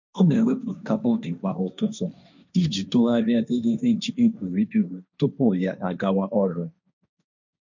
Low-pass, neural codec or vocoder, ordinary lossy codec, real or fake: 7.2 kHz; codec, 16 kHz, 1.1 kbps, Voila-Tokenizer; none; fake